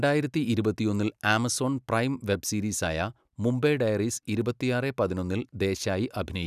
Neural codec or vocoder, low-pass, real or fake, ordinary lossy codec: none; 14.4 kHz; real; none